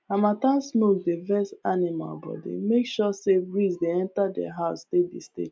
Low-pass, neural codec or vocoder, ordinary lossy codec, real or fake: none; none; none; real